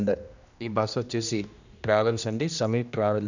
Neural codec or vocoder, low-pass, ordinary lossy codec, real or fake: codec, 16 kHz, 1 kbps, X-Codec, HuBERT features, trained on general audio; 7.2 kHz; none; fake